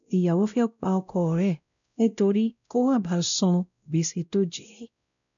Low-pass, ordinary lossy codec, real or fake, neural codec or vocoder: 7.2 kHz; none; fake; codec, 16 kHz, 0.5 kbps, X-Codec, WavLM features, trained on Multilingual LibriSpeech